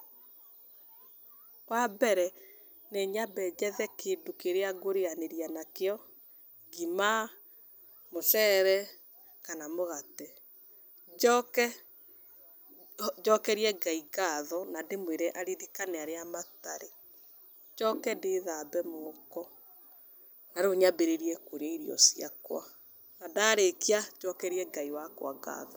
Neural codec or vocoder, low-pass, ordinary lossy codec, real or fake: none; none; none; real